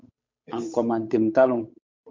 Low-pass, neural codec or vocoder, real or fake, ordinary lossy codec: 7.2 kHz; codec, 16 kHz, 8 kbps, FunCodec, trained on Chinese and English, 25 frames a second; fake; MP3, 48 kbps